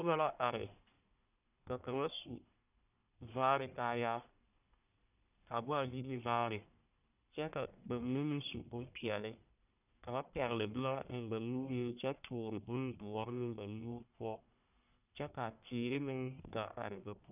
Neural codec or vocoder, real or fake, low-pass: codec, 44.1 kHz, 1.7 kbps, Pupu-Codec; fake; 3.6 kHz